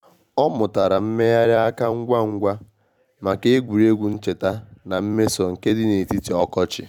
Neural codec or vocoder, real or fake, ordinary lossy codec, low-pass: vocoder, 44.1 kHz, 128 mel bands every 256 samples, BigVGAN v2; fake; none; 19.8 kHz